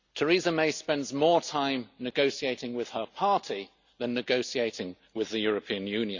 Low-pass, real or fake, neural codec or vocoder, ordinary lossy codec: 7.2 kHz; real; none; Opus, 64 kbps